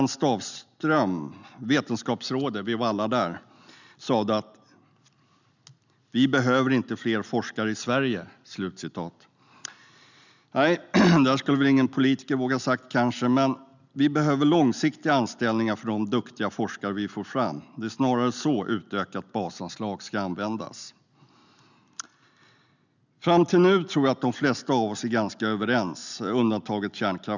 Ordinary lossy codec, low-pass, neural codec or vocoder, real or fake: none; 7.2 kHz; none; real